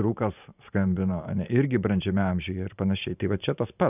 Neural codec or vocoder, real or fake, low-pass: vocoder, 44.1 kHz, 128 mel bands every 512 samples, BigVGAN v2; fake; 3.6 kHz